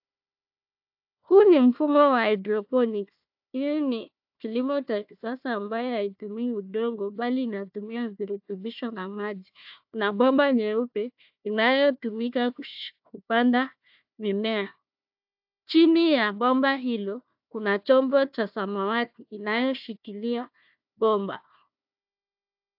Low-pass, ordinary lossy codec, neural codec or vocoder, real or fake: 5.4 kHz; AAC, 48 kbps; codec, 16 kHz, 1 kbps, FunCodec, trained on Chinese and English, 50 frames a second; fake